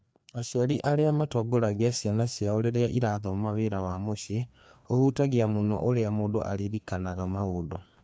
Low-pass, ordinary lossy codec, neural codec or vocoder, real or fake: none; none; codec, 16 kHz, 2 kbps, FreqCodec, larger model; fake